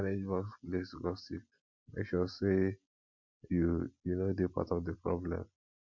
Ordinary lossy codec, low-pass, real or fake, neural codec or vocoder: MP3, 48 kbps; 7.2 kHz; real; none